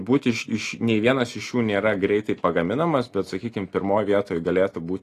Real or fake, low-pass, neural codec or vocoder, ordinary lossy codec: real; 14.4 kHz; none; AAC, 48 kbps